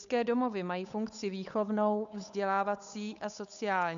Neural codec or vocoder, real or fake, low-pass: codec, 16 kHz, 8 kbps, FunCodec, trained on Chinese and English, 25 frames a second; fake; 7.2 kHz